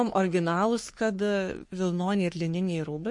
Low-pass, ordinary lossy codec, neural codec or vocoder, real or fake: 10.8 kHz; MP3, 48 kbps; codec, 44.1 kHz, 3.4 kbps, Pupu-Codec; fake